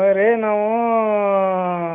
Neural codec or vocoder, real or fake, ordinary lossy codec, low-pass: none; real; none; 3.6 kHz